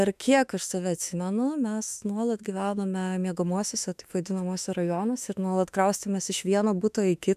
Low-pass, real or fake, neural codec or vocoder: 14.4 kHz; fake; autoencoder, 48 kHz, 32 numbers a frame, DAC-VAE, trained on Japanese speech